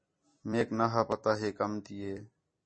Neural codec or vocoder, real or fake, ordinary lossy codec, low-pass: vocoder, 44.1 kHz, 128 mel bands every 256 samples, BigVGAN v2; fake; MP3, 32 kbps; 9.9 kHz